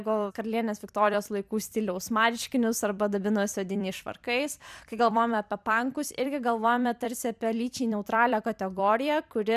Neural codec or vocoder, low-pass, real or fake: vocoder, 44.1 kHz, 128 mel bands every 256 samples, BigVGAN v2; 14.4 kHz; fake